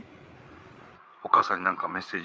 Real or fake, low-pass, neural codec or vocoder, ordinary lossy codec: fake; none; codec, 16 kHz, 8 kbps, FreqCodec, larger model; none